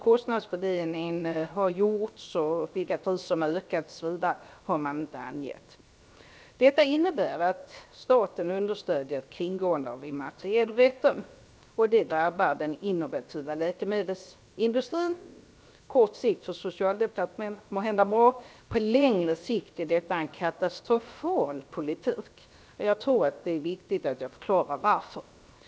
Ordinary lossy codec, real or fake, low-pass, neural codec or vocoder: none; fake; none; codec, 16 kHz, 0.7 kbps, FocalCodec